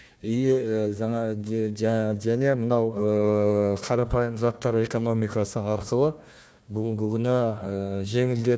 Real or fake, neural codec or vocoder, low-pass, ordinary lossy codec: fake; codec, 16 kHz, 1 kbps, FunCodec, trained on Chinese and English, 50 frames a second; none; none